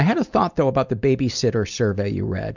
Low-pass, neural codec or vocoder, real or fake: 7.2 kHz; none; real